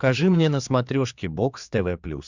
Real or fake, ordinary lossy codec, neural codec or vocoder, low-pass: fake; Opus, 64 kbps; codec, 16 kHz, 4 kbps, X-Codec, HuBERT features, trained on general audio; 7.2 kHz